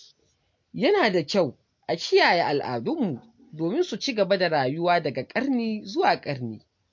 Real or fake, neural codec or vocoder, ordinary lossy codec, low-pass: real; none; MP3, 48 kbps; 7.2 kHz